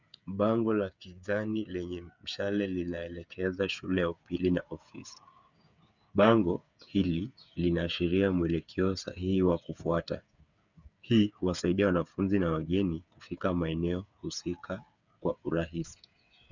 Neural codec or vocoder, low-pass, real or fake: codec, 16 kHz, 8 kbps, FreqCodec, smaller model; 7.2 kHz; fake